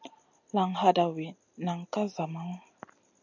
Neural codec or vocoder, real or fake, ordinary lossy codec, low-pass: none; real; MP3, 64 kbps; 7.2 kHz